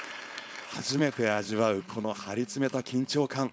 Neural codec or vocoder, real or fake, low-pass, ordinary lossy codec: codec, 16 kHz, 4.8 kbps, FACodec; fake; none; none